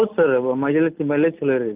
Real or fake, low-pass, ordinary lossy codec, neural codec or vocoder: real; 3.6 kHz; Opus, 32 kbps; none